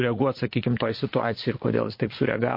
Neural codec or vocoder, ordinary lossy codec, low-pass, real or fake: vocoder, 22.05 kHz, 80 mel bands, Vocos; MP3, 32 kbps; 5.4 kHz; fake